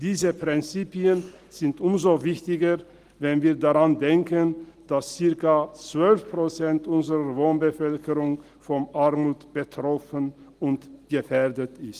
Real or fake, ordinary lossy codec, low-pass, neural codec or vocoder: real; Opus, 24 kbps; 14.4 kHz; none